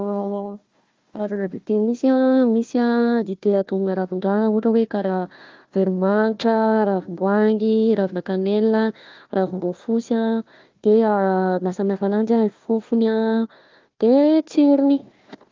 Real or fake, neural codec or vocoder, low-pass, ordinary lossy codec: fake; codec, 16 kHz, 1 kbps, FunCodec, trained on Chinese and English, 50 frames a second; 7.2 kHz; Opus, 32 kbps